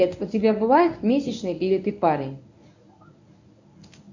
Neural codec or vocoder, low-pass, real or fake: codec, 24 kHz, 0.9 kbps, WavTokenizer, medium speech release version 1; 7.2 kHz; fake